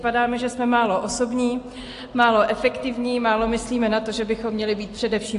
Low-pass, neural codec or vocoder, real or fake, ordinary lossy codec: 10.8 kHz; none; real; AAC, 48 kbps